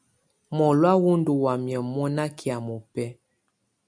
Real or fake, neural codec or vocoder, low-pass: real; none; 9.9 kHz